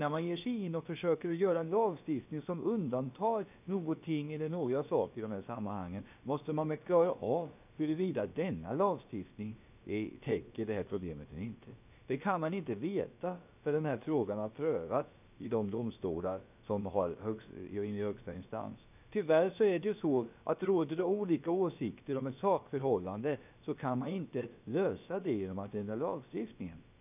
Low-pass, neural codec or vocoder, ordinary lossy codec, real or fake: 3.6 kHz; codec, 16 kHz, about 1 kbps, DyCAST, with the encoder's durations; none; fake